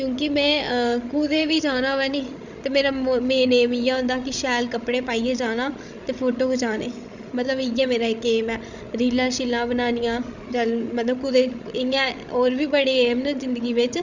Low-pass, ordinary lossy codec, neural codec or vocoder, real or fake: 7.2 kHz; Opus, 64 kbps; codec, 16 kHz, 16 kbps, FreqCodec, larger model; fake